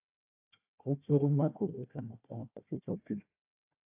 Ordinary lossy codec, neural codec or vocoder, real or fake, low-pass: AAC, 32 kbps; codec, 16 kHz, 1 kbps, FunCodec, trained on Chinese and English, 50 frames a second; fake; 3.6 kHz